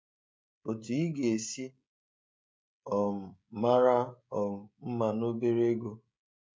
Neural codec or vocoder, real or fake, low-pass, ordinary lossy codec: codec, 16 kHz, 16 kbps, FreqCodec, smaller model; fake; none; none